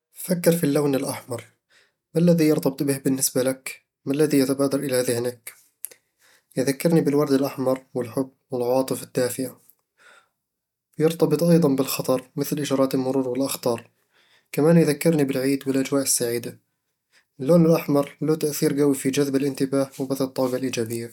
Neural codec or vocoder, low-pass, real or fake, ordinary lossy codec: none; 19.8 kHz; real; none